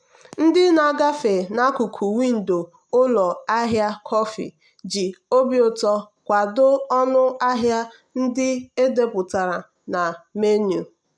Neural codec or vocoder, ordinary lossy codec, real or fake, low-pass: none; none; real; 9.9 kHz